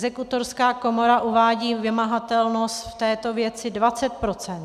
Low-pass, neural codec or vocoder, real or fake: 14.4 kHz; none; real